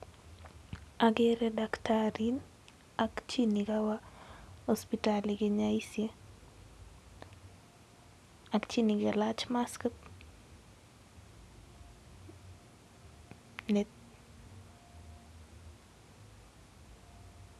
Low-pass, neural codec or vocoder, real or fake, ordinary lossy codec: none; none; real; none